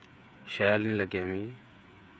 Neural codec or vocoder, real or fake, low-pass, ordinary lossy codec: codec, 16 kHz, 8 kbps, FreqCodec, smaller model; fake; none; none